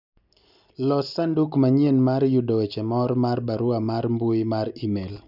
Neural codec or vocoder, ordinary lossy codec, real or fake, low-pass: none; none; real; 5.4 kHz